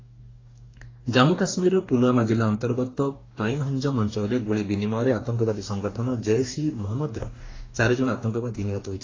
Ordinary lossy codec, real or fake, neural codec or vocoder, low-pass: AAC, 32 kbps; fake; codec, 44.1 kHz, 2.6 kbps, DAC; 7.2 kHz